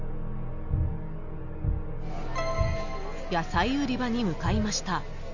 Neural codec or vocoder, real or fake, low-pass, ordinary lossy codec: none; real; 7.2 kHz; none